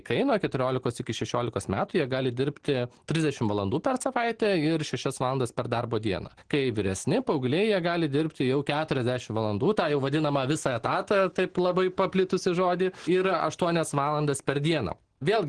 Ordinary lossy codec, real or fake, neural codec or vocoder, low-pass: Opus, 16 kbps; real; none; 9.9 kHz